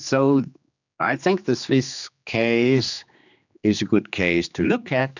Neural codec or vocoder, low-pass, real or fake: codec, 16 kHz, 2 kbps, X-Codec, HuBERT features, trained on general audio; 7.2 kHz; fake